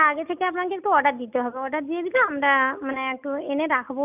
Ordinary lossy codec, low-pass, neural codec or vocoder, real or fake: none; 3.6 kHz; none; real